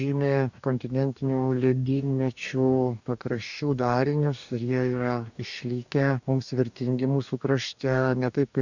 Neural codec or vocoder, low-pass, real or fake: codec, 44.1 kHz, 2.6 kbps, DAC; 7.2 kHz; fake